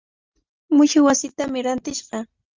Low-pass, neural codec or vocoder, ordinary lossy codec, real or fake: 7.2 kHz; none; Opus, 32 kbps; real